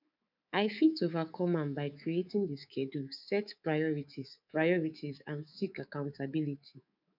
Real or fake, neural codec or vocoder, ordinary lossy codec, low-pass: fake; codec, 24 kHz, 3.1 kbps, DualCodec; none; 5.4 kHz